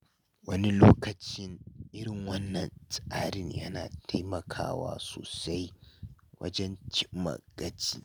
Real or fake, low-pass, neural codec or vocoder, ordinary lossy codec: real; none; none; none